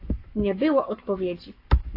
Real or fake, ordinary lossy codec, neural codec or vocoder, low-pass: fake; AAC, 32 kbps; codec, 44.1 kHz, 7.8 kbps, Pupu-Codec; 5.4 kHz